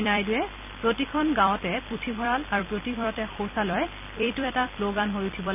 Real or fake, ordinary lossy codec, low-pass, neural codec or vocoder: fake; none; 3.6 kHz; vocoder, 44.1 kHz, 128 mel bands every 256 samples, BigVGAN v2